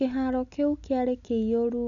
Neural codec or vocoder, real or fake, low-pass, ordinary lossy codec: none; real; 7.2 kHz; none